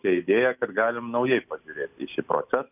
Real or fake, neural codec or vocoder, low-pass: real; none; 3.6 kHz